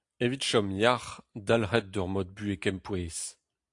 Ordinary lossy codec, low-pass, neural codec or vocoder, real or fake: AAC, 64 kbps; 10.8 kHz; none; real